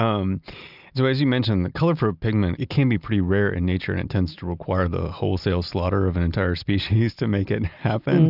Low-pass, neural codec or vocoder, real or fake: 5.4 kHz; none; real